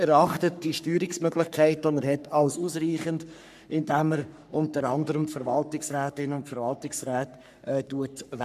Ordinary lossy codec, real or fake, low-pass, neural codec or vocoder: none; fake; 14.4 kHz; codec, 44.1 kHz, 3.4 kbps, Pupu-Codec